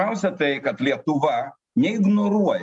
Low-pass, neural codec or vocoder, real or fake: 10.8 kHz; none; real